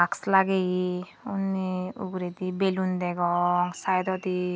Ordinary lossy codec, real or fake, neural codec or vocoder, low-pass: none; real; none; none